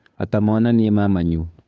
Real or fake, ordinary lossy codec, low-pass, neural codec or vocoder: fake; none; none; codec, 16 kHz, 2 kbps, FunCodec, trained on Chinese and English, 25 frames a second